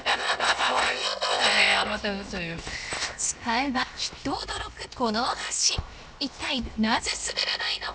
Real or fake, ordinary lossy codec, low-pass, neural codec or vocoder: fake; none; none; codec, 16 kHz, 0.7 kbps, FocalCodec